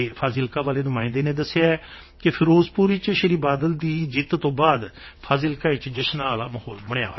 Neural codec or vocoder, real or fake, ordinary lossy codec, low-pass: vocoder, 22.05 kHz, 80 mel bands, WaveNeXt; fake; MP3, 24 kbps; 7.2 kHz